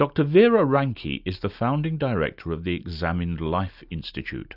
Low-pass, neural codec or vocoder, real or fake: 5.4 kHz; none; real